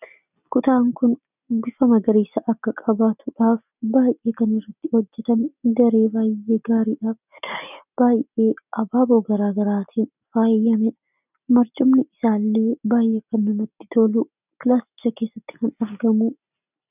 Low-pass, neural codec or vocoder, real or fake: 3.6 kHz; none; real